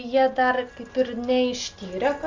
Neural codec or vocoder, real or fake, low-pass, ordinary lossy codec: none; real; 7.2 kHz; Opus, 32 kbps